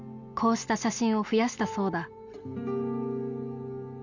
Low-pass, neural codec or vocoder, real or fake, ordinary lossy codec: 7.2 kHz; none; real; none